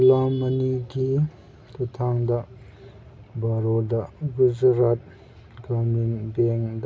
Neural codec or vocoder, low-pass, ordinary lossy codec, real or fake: none; none; none; real